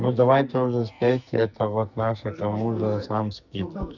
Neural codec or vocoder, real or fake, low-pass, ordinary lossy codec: codec, 44.1 kHz, 2.6 kbps, SNAC; fake; 7.2 kHz; none